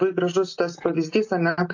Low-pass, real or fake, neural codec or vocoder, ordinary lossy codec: 7.2 kHz; real; none; AAC, 48 kbps